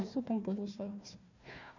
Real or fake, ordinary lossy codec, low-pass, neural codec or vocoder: fake; none; 7.2 kHz; codec, 16 kHz, 1 kbps, FunCodec, trained on Chinese and English, 50 frames a second